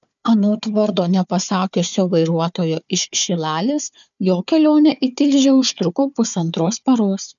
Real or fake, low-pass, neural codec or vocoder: fake; 7.2 kHz; codec, 16 kHz, 4 kbps, FunCodec, trained on Chinese and English, 50 frames a second